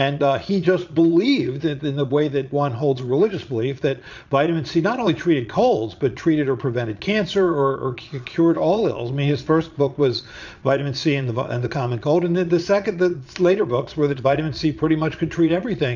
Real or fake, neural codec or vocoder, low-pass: fake; vocoder, 22.05 kHz, 80 mel bands, Vocos; 7.2 kHz